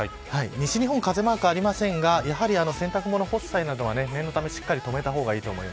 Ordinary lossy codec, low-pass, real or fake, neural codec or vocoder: none; none; real; none